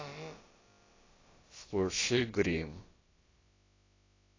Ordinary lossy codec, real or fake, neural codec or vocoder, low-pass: AAC, 32 kbps; fake; codec, 16 kHz, about 1 kbps, DyCAST, with the encoder's durations; 7.2 kHz